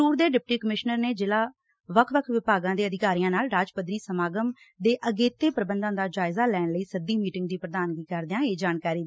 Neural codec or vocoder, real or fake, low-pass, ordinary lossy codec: none; real; none; none